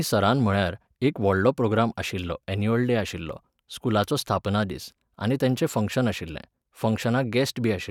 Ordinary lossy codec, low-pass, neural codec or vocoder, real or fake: none; 19.8 kHz; vocoder, 44.1 kHz, 128 mel bands every 512 samples, BigVGAN v2; fake